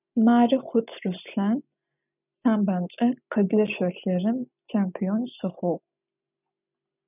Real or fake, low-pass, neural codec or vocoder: real; 3.6 kHz; none